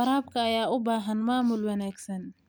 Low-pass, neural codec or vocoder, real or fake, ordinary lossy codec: none; none; real; none